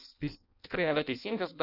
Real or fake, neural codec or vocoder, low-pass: fake; codec, 16 kHz in and 24 kHz out, 0.6 kbps, FireRedTTS-2 codec; 5.4 kHz